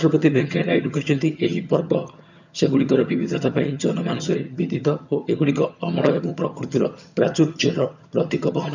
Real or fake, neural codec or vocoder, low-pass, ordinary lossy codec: fake; vocoder, 22.05 kHz, 80 mel bands, HiFi-GAN; 7.2 kHz; none